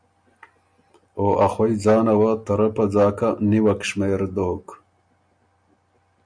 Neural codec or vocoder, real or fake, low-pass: none; real; 9.9 kHz